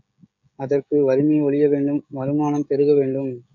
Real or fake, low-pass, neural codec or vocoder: fake; 7.2 kHz; codec, 16 kHz, 16 kbps, FreqCodec, smaller model